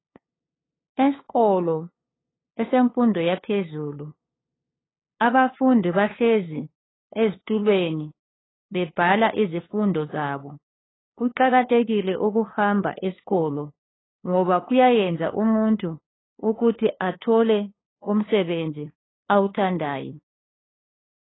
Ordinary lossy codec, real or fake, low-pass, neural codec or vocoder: AAC, 16 kbps; fake; 7.2 kHz; codec, 16 kHz, 2 kbps, FunCodec, trained on LibriTTS, 25 frames a second